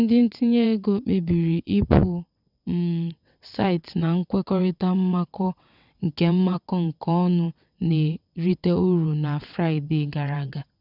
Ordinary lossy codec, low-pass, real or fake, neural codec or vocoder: none; 5.4 kHz; fake; vocoder, 22.05 kHz, 80 mel bands, WaveNeXt